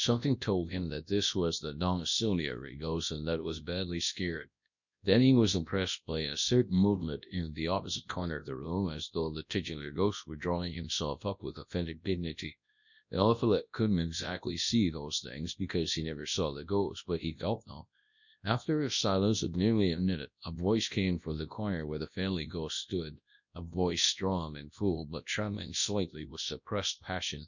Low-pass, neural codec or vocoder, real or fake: 7.2 kHz; codec, 24 kHz, 0.9 kbps, WavTokenizer, large speech release; fake